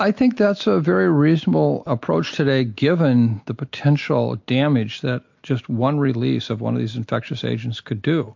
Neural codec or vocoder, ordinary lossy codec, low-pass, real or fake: none; MP3, 48 kbps; 7.2 kHz; real